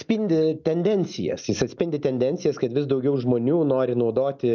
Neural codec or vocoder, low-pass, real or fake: none; 7.2 kHz; real